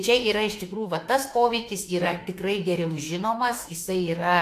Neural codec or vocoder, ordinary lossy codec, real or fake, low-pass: autoencoder, 48 kHz, 32 numbers a frame, DAC-VAE, trained on Japanese speech; AAC, 64 kbps; fake; 14.4 kHz